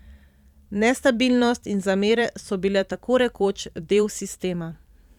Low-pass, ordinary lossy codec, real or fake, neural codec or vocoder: 19.8 kHz; none; fake; vocoder, 44.1 kHz, 128 mel bands every 512 samples, BigVGAN v2